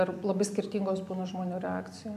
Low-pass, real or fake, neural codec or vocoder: 14.4 kHz; real; none